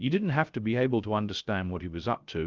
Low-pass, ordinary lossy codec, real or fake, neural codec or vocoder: 7.2 kHz; Opus, 32 kbps; fake; codec, 16 kHz, 0.3 kbps, FocalCodec